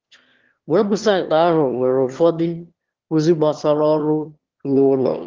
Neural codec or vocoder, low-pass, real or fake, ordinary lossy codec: autoencoder, 22.05 kHz, a latent of 192 numbers a frame, VITS, trained on one speaker; 7.2 kHz; fake; Opus, 16 kbps